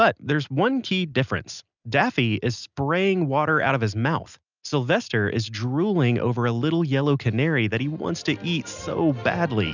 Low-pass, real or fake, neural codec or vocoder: 7.2 kHz; real; none